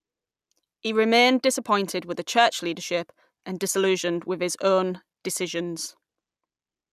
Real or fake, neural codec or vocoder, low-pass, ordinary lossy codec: real; none; 14.4 kHz; none